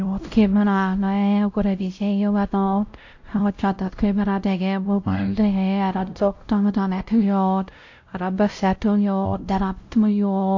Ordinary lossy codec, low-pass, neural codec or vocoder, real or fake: AAC, 48 kbps; 7.2 kHz; codec, 16 kHz, 0.5 kbps, X-Codec, WavLM features, trained on Multilingual LibriSpeech; fake